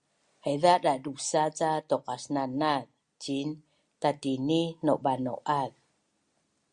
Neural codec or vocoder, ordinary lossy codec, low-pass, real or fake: none; Opus, 64 kbps; 9.9 kHz; real